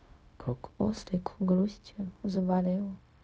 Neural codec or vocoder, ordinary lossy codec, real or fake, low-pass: codec, 16 kHz, 0.4 kbps, LongCat-Audio-Codec; none; fake; none